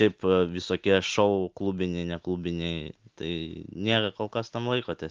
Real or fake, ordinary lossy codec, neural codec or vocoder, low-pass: real; Opus, 32 kbps; none; 7.2 kHz